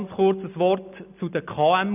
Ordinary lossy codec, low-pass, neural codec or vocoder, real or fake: none; 3.6 kHz; none; real